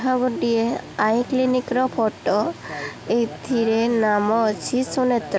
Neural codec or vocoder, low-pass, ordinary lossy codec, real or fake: none; none; none; real